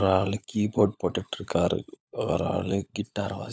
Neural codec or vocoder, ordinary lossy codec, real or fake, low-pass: codec, 16 kHz, 16 kbps, FunCodec, trained on LibriTTS, 50 frames a second; none; fake; none